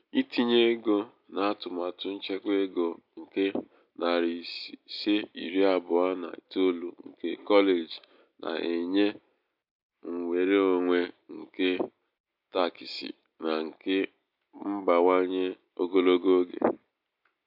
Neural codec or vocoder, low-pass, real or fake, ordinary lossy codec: none; 5.4 kHz; real; MP3, 48 kbps